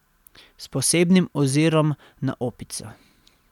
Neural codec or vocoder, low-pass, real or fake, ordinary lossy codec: none; 19.8 kHz; real; none